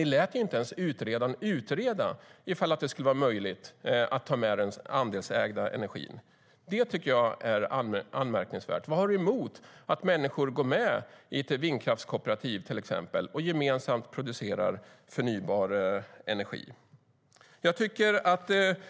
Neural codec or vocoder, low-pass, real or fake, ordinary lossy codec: none; none; real; none